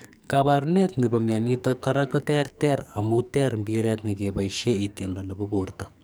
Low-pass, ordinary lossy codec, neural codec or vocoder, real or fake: none; none; codec, 44.1 kHz, 2.6 kbps, SNAC; fake